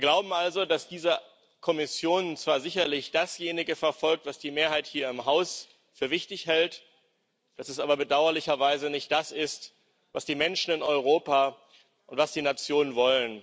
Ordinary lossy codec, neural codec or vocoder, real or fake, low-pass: none; none; real; none